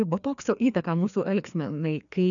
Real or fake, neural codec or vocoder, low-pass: fake; codec, 16 kHz, 2 kbps, FreqCodec, larger model; 7.2 kHz